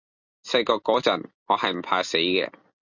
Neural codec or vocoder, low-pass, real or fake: none; 7.2 kHz; real